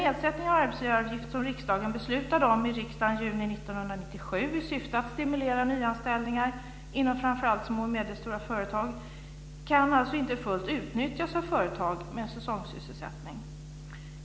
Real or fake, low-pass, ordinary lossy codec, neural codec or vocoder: real; none; none; none